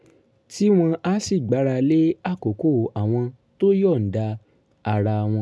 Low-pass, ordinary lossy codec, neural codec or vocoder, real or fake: none; none; none; real